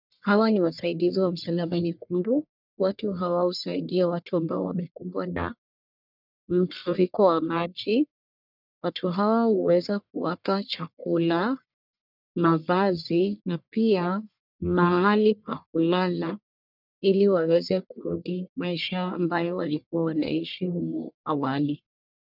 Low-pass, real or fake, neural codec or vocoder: 5.4 kHz; fake; codec, 44.1 kHz, 1.7 kbps, Pupu-Codec